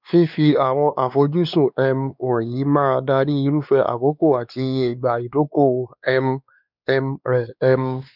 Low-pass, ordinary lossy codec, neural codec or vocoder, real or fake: 5.4 kHz; none; codec, 16 kHz, 2 kbps, X-Codec, WavLM features, trained on Multilingual LibriSpeech; fake